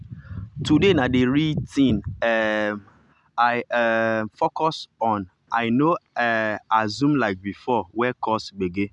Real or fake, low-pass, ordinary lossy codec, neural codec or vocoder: real; 10.8 kHz; none; none